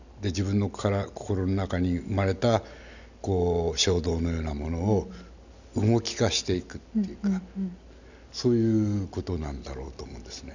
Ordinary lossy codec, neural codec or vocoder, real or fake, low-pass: none; none; real; 7.2 kHz